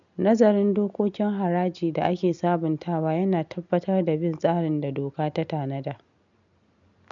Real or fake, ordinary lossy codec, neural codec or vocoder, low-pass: real; none; none; 7.2 kHz